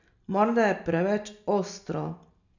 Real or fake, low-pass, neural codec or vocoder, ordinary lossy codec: real; 7.2 kHz; none; none